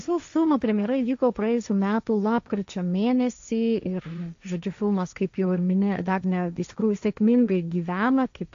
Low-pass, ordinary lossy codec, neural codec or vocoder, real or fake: 7.2 kHz; AAC, 64 kbps; codec, 16 kHz, 1.1 kbps, Voila-Tokenizer; fake